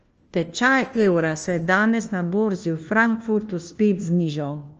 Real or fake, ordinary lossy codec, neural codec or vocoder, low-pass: fake; Opus, 24 kbps; codec, 16 kHz, 1 kbps, FunCodec, trained on LibriTTS, 50 frames a second; 7.2 kHz